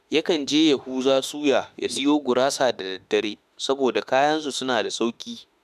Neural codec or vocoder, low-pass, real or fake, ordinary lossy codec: autoencoder, 48 kHz, 32 numbers a frame, DAC-VAE, trained on Japanese speech; 14.4 kHz; fake; none